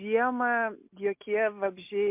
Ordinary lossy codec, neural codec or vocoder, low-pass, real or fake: MP3, 32 kbps; none; 3.6 kHz; real